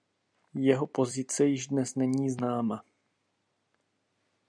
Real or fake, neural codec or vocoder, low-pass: real; none; 9.9 kHz